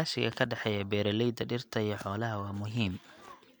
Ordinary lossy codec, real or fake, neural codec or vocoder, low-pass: none; real; none; none